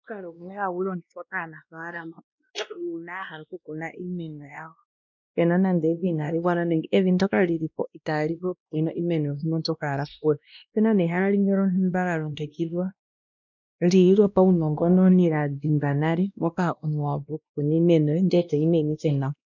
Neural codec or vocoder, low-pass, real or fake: codec, 16 kHz, 1 kbps, X-Codec, WavLM features, trained on Multilingual LibriSpeech; 7.2 kHz; fake